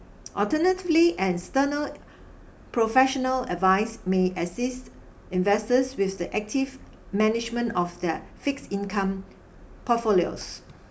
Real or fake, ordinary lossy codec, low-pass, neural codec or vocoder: real; none; none; none